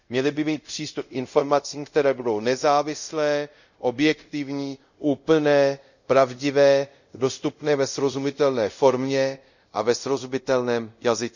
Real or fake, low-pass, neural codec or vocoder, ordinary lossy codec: fake; 7.2 kHz; codec, 24 kHz, 0.5 kbps, DualCodec; none